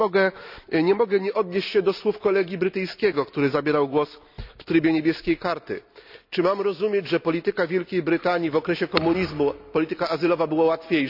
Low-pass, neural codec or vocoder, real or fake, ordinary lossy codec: 5.4 kHz; none; real; none